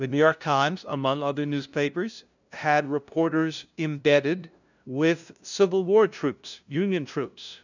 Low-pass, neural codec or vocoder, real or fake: 7.2 kHz; codec, 16 kHz, 0.5 kbps, FunCodec, trained on LibriTTS, 25 frames a second; fake